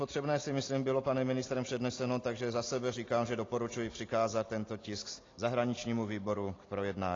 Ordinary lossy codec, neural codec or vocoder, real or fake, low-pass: AAC, 32 kbps; none; real; 7.2 kHz